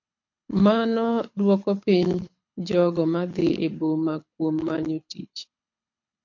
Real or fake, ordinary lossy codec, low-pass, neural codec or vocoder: fake; MP3, 48 kbps; 7.2 kHz; codec, 24 kHz, 6 kbps, HILCodec